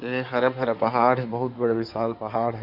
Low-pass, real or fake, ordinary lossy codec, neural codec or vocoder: 5.4 kHz; fake; none; codec, 16 kHz in and 24 kHz out, 2.2 kbps, FireRedTTS-2 codec